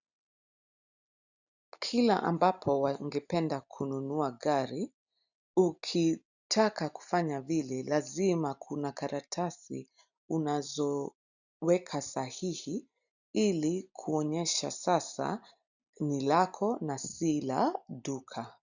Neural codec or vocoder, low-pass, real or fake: none; 7.2 kHz; real